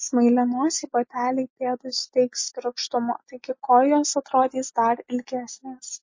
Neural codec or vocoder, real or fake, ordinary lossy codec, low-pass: none; real; MP3, 32 kbps; 7.2 kHz